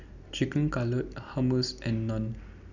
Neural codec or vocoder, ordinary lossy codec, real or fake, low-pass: none; none; real; 7.2 kHz